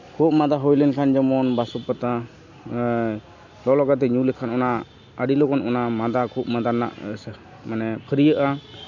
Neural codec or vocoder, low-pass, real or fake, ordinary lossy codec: none; 7.2 kHz; real; none